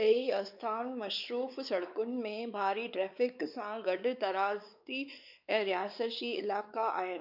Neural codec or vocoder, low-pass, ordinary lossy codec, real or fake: codec, 16 kHz, 4 kbps, X-Codec, WavLM features, trained on Multilingual LibriSpeech; 5.4 kHz; none; fake